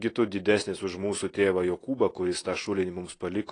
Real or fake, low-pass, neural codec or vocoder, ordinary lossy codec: real; 9.9 kHz; none; AAC, 32 kbps